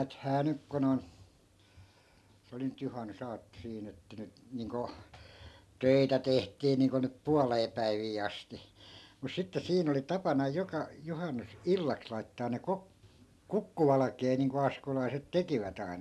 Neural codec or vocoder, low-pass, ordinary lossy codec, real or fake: none; none; none; real